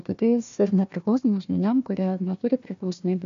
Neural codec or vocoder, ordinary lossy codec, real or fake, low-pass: codec, 16 kHz, 1 kbps, FunCodec, trained on Chinese and English, 50 frames a second; MP3, 64 kbps; fake; 7.2 kHz